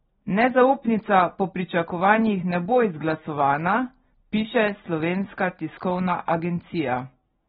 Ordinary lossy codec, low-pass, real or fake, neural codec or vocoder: AAC, 16 kbps; 19.8 kHz; real; none